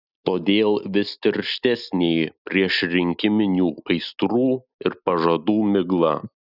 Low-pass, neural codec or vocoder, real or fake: 5.4 kHz; none; real